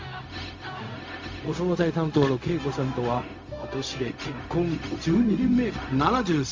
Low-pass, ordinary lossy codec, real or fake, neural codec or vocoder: 7.2 kHz; Opus, 32 kbps; fake; codec, 16 kHz, 0.4 kbps, LongCat-Audio-Codec